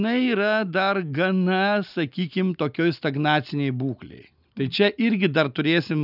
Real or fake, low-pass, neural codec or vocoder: real; 5.4 kHz; none